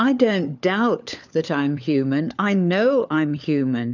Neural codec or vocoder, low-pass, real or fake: codec, 16 kHz, 4 kbps, FunCodec, trained on LibriTTS, 50 frames a second; 7.2 kHz; fake